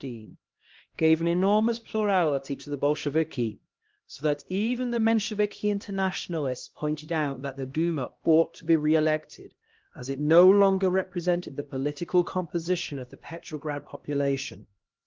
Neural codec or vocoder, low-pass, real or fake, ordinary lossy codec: codec, 16 kHz, 1 kbps, X-Codec, HuBERT features, trained on LibriSpeech; 7.2 kHz; fake; Opus, 16 kbps